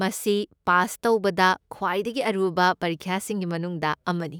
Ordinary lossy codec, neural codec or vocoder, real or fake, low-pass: none; autoencoder, 48 kHz, 128 numbers a frame, DAC-VAE, trained on Japanese speech; fake; none